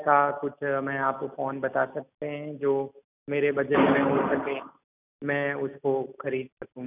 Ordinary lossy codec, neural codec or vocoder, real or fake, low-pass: none; none; real; 3.6 kHz